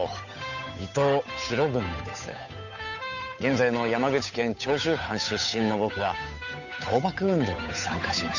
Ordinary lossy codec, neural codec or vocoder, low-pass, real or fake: none; codec, 16 kHz, 8 kbps, FunCodec, trained on Chinese and English, 25 frames a second; 7.2 kHz; fake